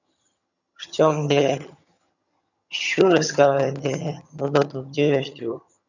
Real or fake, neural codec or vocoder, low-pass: fake; vocoder, 22.05 kHz, 80 mel bands, HiFi-GAN; 7.2 kHz